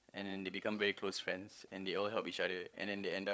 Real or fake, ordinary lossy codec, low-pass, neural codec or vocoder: fake; none; none; codec, 16 kHz, 8 kbps, FreqCodec, larger model